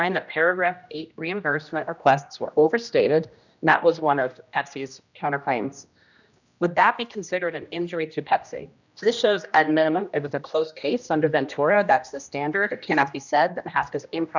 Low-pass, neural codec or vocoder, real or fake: 7.2 kHz; codec, 16 kHz, 1 kbps, X-Codec, HuBERT features, trained on general audio; fake